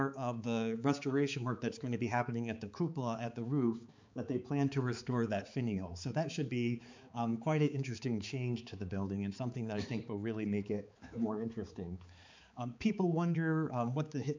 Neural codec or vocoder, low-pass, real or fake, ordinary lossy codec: codec, 16 kHz, 4 kbps, X-Codec, HuBERT features, trained on balanced general audio; 7.2 kHz; fake; MP3, 64 kbps